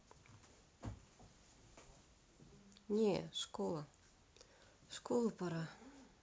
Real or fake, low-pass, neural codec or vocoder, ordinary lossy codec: real; none; none; none